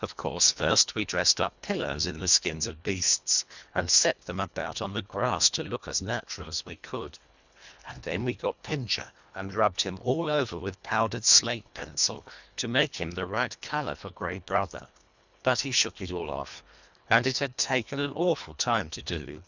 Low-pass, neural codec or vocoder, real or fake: 7.2 kHz; codec, 24 kHz, 1.5 kbps, HILCodec; fake